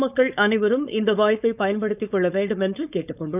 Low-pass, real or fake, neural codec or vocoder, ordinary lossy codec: 3.6 kHz; fake; codec, 16 kHz, 4.8 kbps, FACodec; none